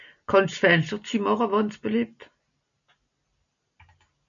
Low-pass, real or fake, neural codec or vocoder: 7.2 kHz; real; none